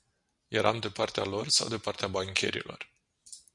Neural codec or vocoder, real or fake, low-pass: none; real; 10.8 kHz